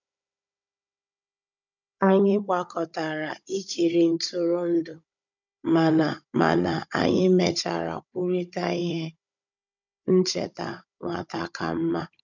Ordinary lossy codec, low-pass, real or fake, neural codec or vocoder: none; 7.2 kHz; fake; codec, 16 kHz, 16 kbps, FunCodec, trained on Chinese and English, 50 frames a second